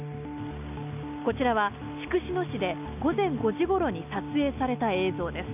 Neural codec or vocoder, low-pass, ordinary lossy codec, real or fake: none; 3.6 kHz; none; real